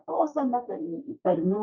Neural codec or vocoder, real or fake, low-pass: codec, 32 kHz, 1.9 kbps, SNAC; fake; 7.2 kHz